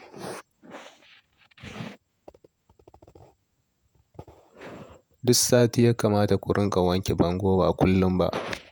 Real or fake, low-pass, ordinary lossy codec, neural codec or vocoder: fake; none; none; vocoder, 48 kHz, 128 mel bands, Vocos